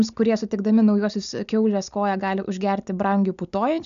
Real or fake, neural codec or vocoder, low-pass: real; none; 7.2 kHz